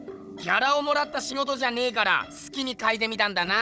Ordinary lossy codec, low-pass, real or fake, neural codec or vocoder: none; none; fake; codec, 16 kHz, 16 kbps, FunCodec, trained on Chinese and English, 50 frames a second